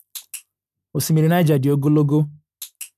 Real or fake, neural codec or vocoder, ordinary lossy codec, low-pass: real; none; none; 14.4 kHz